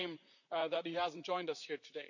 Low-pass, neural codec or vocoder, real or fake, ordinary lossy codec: 7.2 kHz; vocoder, 44.1 kHz, 128 mel bands, Pupu-Vocoder; fake; none